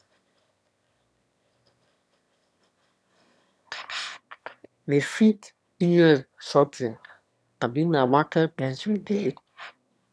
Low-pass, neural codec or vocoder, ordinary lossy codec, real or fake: none; autoencoder, 22.05 kHz, a latent of 192 numbers a frame, VITS, trained on one speaker; none; fake